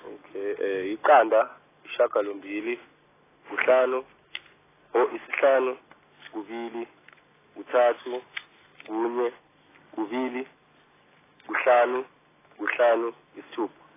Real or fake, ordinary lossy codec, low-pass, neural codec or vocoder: real; AAC, 16 kbps; 3.6 kHz; none